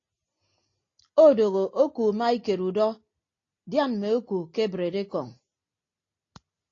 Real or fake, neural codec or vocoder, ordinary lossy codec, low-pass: real; none; AAC, 32 kbps; 7.2 kHz